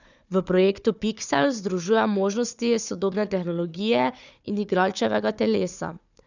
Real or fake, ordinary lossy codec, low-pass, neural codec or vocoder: fake; none; 7.2 kHz; codec, 16 kHz, 4 kbps, FunCodec, trained on Chinese and English, 50 frames a second